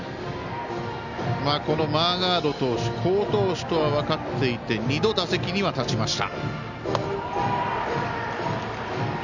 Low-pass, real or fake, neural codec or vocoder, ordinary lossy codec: 7.2 kHz; real; none; none